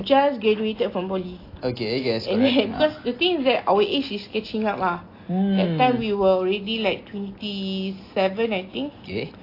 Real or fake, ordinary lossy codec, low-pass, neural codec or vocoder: real; AAC, 32 kbps; 5.4 kHz; none